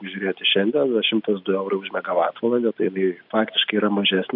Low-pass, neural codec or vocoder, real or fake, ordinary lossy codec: 5.4 kHz; none; real; AAC, 48 kbps